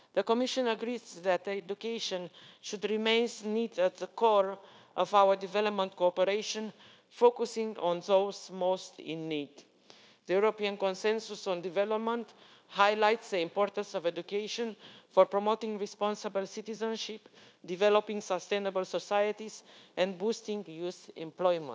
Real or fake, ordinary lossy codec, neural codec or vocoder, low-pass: fake; none; codec, 16 kHz, 0.9 kbps, LongCat-Audio-Codec; none